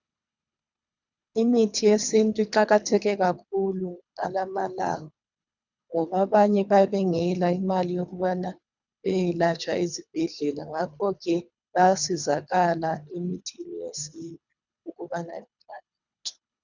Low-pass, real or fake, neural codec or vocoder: 7.2 kHz; fake; codec, 24 kHz, 3 kbps, HILCodec